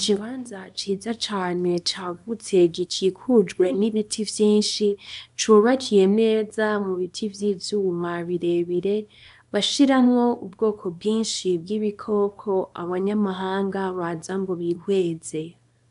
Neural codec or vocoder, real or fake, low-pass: codec, 24 kHz, 0.9 kbps, WavTokenizer, small release; fake; 10.8 kHz